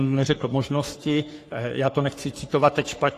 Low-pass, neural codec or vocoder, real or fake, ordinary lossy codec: 14.4 kHz; codec, 44.1 kHz, 3.4 kbps, Pupu-Codec; fake; AAC, 48 kbps